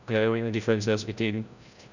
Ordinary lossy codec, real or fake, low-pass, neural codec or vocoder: none; fake; 7.2 kHz; codec, 16 kHz, 0.5 kbps, FreqCodec, larger model